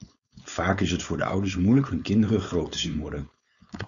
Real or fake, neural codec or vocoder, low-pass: fake; codec, 16 kHz, 4.8 kbps, FACodec; 7.2 kHz